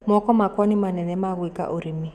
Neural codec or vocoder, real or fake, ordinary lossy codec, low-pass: autoencoder, 48 kHz, 128 numbers a frame, DAC-VAE, trained on Japanese speech; fake; none; 14.4 kHz